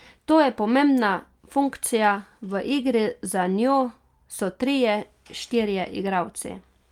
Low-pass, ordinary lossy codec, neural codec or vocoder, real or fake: 19.8 kHz; Opus, 32 kbps; none; real